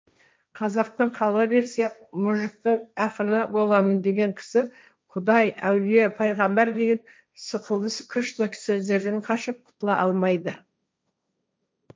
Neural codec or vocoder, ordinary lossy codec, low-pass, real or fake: codec, 16 kHz, 1.1 kbps, Voila-Tokenizer; none; none; fake